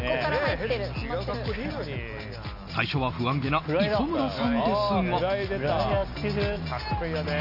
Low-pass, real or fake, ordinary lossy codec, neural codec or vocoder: 5.4 kHz; real; none; none